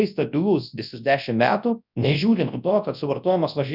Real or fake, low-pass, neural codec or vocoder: fake; 5.4 kHz; codec, 24 kHz, 0.9 kbps, WavTokenizer, large speech release